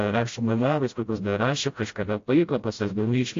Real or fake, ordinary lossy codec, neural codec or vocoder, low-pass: fake; AAC, 48 kbps; codec, 16 kHz, 0.5 kbps, FreqCodec, smaller model; 7.2 kHz